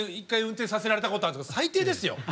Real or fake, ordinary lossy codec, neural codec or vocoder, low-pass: real; none; none; none